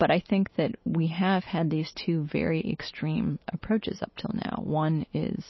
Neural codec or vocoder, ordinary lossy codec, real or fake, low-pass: none; MP3, 24 kbps; real; 7.2 kHz